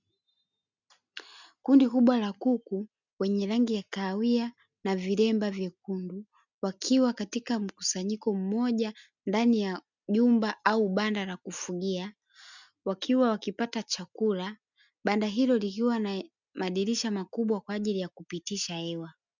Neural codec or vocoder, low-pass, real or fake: none; 7.2 kHz; real